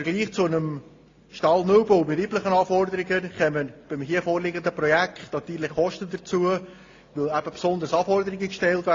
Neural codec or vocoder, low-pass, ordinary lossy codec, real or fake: none; 7.2 kHz; AAC, 32 kbps; real